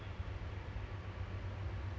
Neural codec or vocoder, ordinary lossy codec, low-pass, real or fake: none; none; none; real